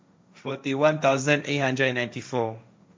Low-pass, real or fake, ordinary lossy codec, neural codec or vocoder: none; fake; none; codec, 16 kHz, 1.1 kbps, Voila-Tokenizer